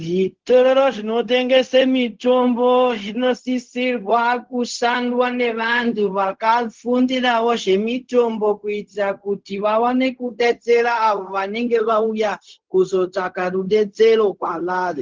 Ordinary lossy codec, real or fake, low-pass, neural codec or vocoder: Opus, 16 kbps; fake; 7.2 kHz; codec, 16 kHz, 0.4 kbps, LongCat-Audio-Codec